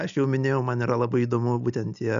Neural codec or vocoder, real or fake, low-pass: none; real; 7.2 kHz